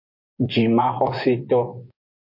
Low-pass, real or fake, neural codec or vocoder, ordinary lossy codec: 5.4 kHz; fake; vocoder, 24 kHz, 100 mel bands, Vocos; MP3, 32 kbps